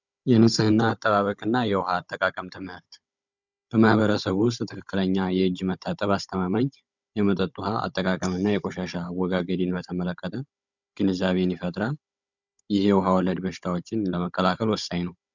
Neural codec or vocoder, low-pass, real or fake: codec, 16 kHz, 16 kbps, FunCodec, trained on Chinese and English, 50 frames a second; 7.2 kHz; fake